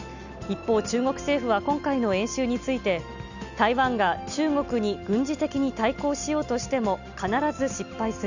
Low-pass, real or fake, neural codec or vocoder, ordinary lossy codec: 7.2 kHz; real; none; none